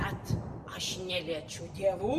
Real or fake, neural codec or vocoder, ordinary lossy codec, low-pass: real; none; Opus, 64 kbps; 14.4 kHz